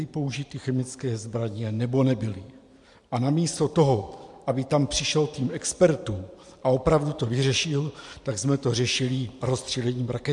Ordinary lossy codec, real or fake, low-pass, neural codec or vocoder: MP3, 64 kbps; fake; 10.8 kHz; vocoder, 44.1 kHz, 128 mel bands every 512 samples, BigVGAN v2